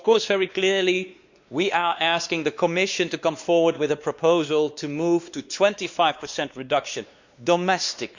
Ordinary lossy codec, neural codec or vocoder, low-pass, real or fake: Opus, 64 kbps; codec, 16 kHz, 2 kbps, X-Codec, WavLM features, trained on Multilingual LibriSpeech; 7.2 kHz; fake